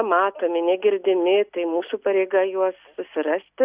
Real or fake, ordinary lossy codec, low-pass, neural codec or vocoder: real; Opus, 64 kbps; 3.6 kHz; none